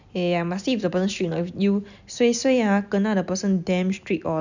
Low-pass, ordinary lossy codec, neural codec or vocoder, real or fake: 7.2 kHz; none; none; real